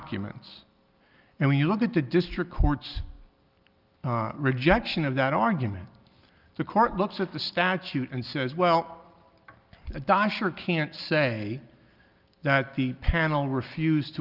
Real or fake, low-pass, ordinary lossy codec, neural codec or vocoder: real; 5.4 kHz; Opus, 24 kbps; none